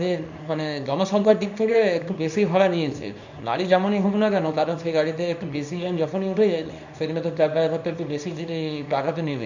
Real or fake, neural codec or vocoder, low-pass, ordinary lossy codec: fake; codec, 24 kHz, 0.9 kbps, WavTokenizer, small release; 7.2 kHz; none